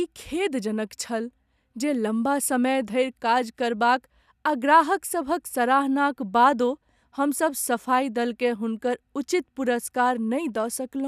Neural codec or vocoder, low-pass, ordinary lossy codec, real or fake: none; 14.4 kHz; none; real